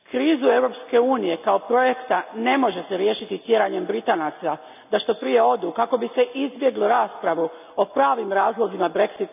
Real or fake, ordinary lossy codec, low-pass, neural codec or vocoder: real; none; 3.6 kHz; none